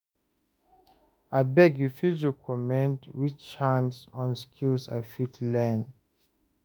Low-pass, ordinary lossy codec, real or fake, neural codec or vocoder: none; none; fake; autoencoder, 48 kHz, 32 numbers a frame, DAC-VAE, trained on Japanese speech